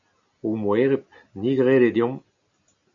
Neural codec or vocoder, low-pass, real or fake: none; 7.2 kHz; real